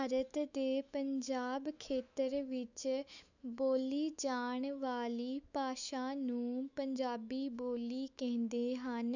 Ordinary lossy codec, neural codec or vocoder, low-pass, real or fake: none; autoencoder, 48 kHz, 128 numbers a frame, DAC-VAE, trained on Japanese speech; 7.2 kHz; fake